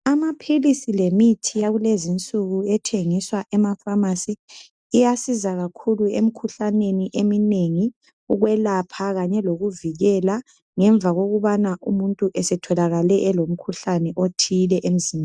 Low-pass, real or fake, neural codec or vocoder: 9.9 kHz; real; none